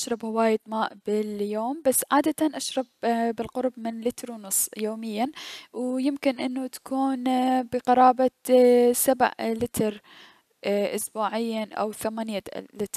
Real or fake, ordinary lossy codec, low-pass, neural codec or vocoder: real; none; 14.4 kHz; none